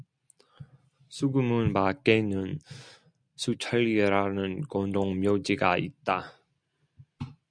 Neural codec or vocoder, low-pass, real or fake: none; 9.9 kHz; real